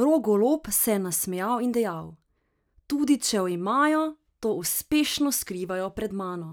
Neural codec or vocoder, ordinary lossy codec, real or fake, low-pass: none; none; real; none